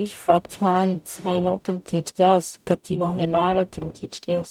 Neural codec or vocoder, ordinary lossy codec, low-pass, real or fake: codec, 44.1 kHz, 0.9 kbps, DAC; none; 19.8 kHz; fake